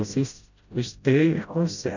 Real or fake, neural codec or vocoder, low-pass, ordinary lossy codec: fake; codec, 16 kHz, 0.5 kbps, FreqCodec, smaller model; 7.2 kHz; none